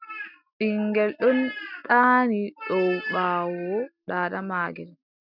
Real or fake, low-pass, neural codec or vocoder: real; 5.4 kHz; none